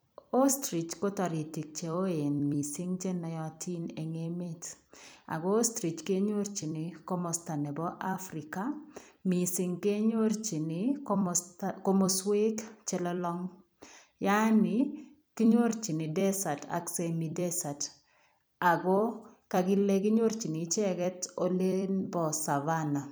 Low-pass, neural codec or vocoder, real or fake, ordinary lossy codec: none; vocoder, 44.1 kHz, 128 mel bands every 256 samples, BigVGAN v2; fake; none